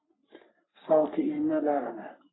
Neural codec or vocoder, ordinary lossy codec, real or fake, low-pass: codec, 44.1 kHz, 3.4 kbps, Pupu-Codec; AAC, 16 kbps; fake; 7.2 kHz